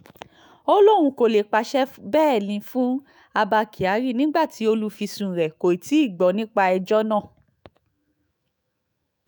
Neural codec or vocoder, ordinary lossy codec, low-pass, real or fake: autoencoder, 48 kHz, 128 numbers a frame, DAC-VAE, trained on Japanese speech; none; none; fake